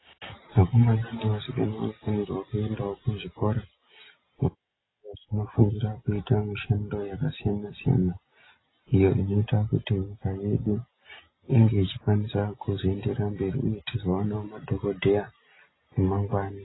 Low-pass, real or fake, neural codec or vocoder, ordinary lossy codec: 7.2 kHz; real; none; AAC, 16 kbps